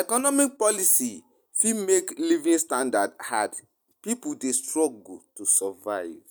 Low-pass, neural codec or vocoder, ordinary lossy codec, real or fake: none; none; none; real